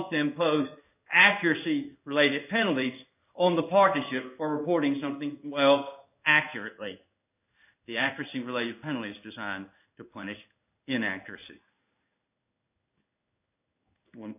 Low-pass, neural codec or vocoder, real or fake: 3.6 kHz; codec, 16 kHz in and 24 kHz out, 1 kbps, XY-Tokenizer; fake